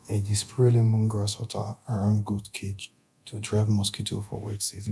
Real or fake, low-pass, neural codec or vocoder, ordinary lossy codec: fake; none; codec, 24 kHz, 0.9 kbps, DualCodec; none